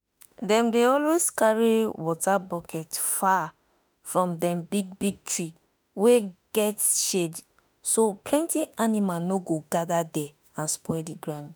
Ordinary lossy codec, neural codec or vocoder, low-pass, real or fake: none; autoencoder, 48 kHz, 32 numbers a frame, DAC-VAE, trained on Japanese speech; none; fake